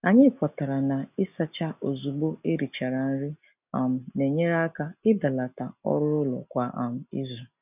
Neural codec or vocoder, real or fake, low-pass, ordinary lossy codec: none; real; 3.6 kHz; none